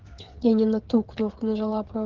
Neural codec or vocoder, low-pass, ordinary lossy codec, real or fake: codec, 16 kHz, 8 kbps, FreqCodec, smaller model; 7.2 kHz; Opus, 16 kbps; fake